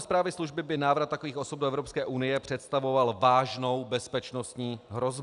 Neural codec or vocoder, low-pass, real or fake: none; 10.8 kHz; real